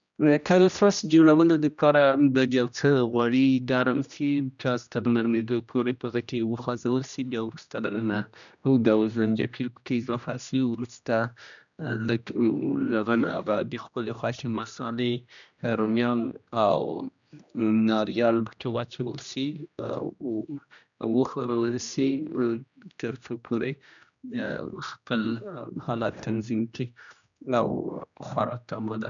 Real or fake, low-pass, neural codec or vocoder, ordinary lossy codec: fake; 7.2 kHz; codec, 16 kHz, 1 kbps, X-Codec, HuBERT features, trained on general audio; none